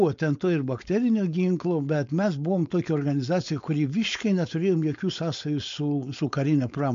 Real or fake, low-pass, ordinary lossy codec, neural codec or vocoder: fake; 7.2 kHz; MP3, 48 kbps; codec, 16 kHz, 4.8 kbps, FACodec